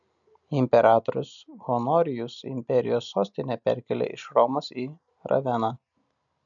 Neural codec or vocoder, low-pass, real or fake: none; 7.2 kHz; real